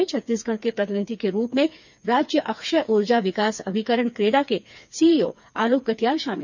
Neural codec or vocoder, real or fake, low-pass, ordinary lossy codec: codec, 16 kHz, 4 kbps, FreqCodec, smaller model; fake; 7.2 kHz; none